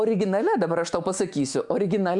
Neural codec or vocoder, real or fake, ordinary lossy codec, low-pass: autoencoder, 48 kHz, 128 numbers a frame, DAC-VAE, trained on Japanese speech; fake; MP3, 96 kbps; 10.8 kHz